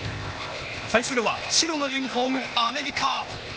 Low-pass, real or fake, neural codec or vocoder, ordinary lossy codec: none; fake; codec, 16 kHz, 0.8 kbps, ZipCodec; none